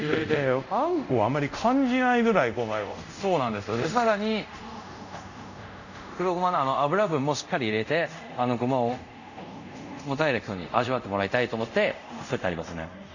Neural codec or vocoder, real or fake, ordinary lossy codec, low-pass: codec, 24 kHz, 0.5 kbps, DualCodec; fake; none; 7.2 kHz